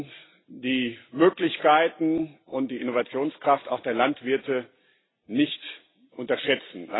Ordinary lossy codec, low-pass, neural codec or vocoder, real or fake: AAC, 16 kbps; 7.2 kHz; none; real